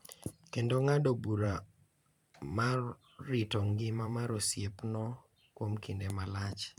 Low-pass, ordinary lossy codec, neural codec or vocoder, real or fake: 19.8 kHz; none; vocoder, 44.1 kHz, 128 mel bands every 512 samples, BigVGAN v2; fake